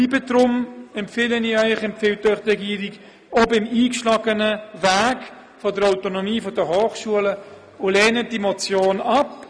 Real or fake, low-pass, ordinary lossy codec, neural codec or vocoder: real; none; none; none